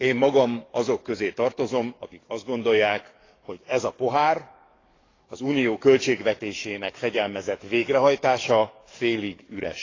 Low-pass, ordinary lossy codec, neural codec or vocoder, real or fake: 7.2 kHz; AAC, 32 kbps; codec, 44.1 kHz, 7.8 kbps, DAC; fake